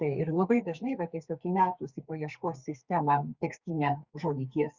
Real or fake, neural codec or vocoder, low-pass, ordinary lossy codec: fake; codec, 44.1 kHz, 2.6 kbps, SNAC; 7.2 kHz; Opus, 64 kbps